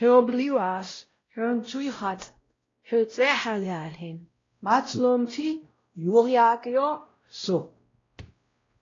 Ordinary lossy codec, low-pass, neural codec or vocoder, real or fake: AAC, 32 kbps; 7.2 kHz; codec, 16 kHz, 0.5 kbps, X-Codec, WavLM features, trained on Multilingual LibriSpeech; fake